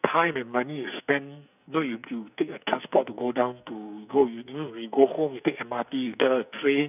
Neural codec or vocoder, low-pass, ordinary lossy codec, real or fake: codec, 44.1 kHz, 2.6 kbps, SNAC; 3.6 kHz; none; fake